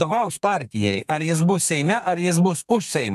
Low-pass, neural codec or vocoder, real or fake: 14.4 kHz; codec, 32 kHz, 1.9 kbps, SNAC; fake